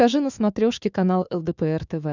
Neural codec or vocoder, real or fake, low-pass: autoencoder, 48 kHz, 128 numbers a frame, DAC-VAE, trained on Japanese speech; fake; 7.2 kHz